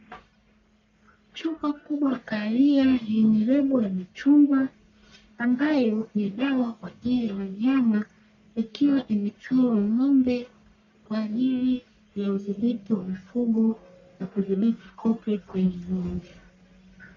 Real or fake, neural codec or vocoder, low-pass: fake; codec, 44.1 kHz, 1.7 kbps, Pupu-Codec; 7.2 kHz